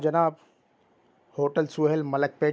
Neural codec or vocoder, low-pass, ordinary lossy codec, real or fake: none; none; none; real